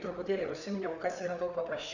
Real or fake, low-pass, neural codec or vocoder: fake; 7.2 kHz; codec, 16 kHz, 4 kbps, FreqCodec, larger model